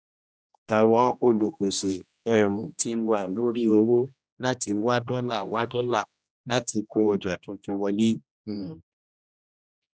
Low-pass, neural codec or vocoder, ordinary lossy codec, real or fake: none; codec, 16 kHz, 1 kbps, X-Codec, HuBERT features, trained on general audio; none; fake